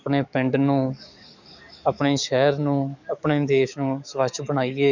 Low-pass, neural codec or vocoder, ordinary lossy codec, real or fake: 7.2 kHz; codec, 44.1 kHz, 7.8 kbps, DAC; none; fake